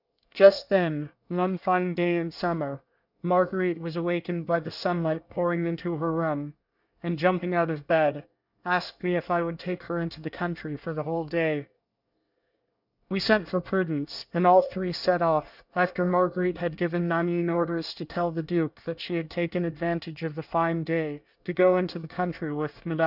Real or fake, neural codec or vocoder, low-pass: fake; codec, 24 kHz, 1 kbps, SNAC; 5.4 kHz